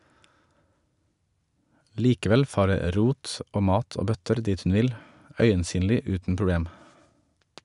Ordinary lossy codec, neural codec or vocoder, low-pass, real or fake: none; none; 10.8 kHz; real